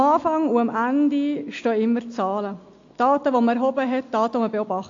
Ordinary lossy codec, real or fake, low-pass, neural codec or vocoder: AAC, 48 kbps; real; 7.2 kHz; none